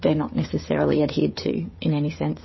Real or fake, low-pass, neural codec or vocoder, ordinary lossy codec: fake; 7.2 kHz; codec, 16 kHz, 16 kbps, FreqCodec, smaller model; MP3, 24 kbps